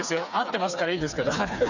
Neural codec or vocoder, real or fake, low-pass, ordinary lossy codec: codec, 16 kHz, 4 kbps, FreqCodec, smaller model; fake; 7.2 kHz; none